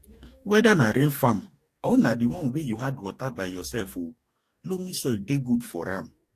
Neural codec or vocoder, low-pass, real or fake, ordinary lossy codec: codec, 44.1 kHz, 2.6 kbps, DAC; 14.4 kHz; fake; AAC, 64 kbps